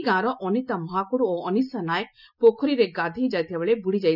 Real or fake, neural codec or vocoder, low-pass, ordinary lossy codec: real; none; 5.4 kHz; none